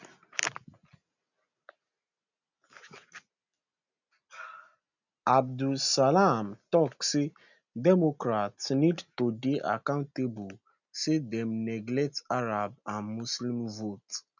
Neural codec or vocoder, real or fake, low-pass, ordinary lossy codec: none; real; 7.2 kHz; none